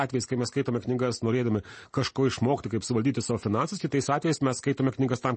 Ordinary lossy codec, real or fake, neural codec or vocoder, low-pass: MP3, 32 kbps; real; none; 10.8 kHz